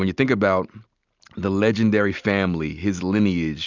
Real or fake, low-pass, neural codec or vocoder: real; 7.2 kHz; none